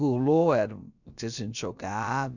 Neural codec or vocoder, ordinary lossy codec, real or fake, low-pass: codec, 16 kHz, about 1 kbps, DyCAST, with the encoder's durations; none; fake; 7.2 kHz